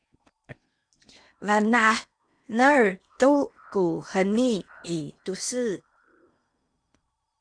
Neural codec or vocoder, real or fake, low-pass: codec, 16 kHz in and 24 kHz out, 0.8 kbps, FocalCodec, streaming, 65536 codes; fake; 9.9 kHz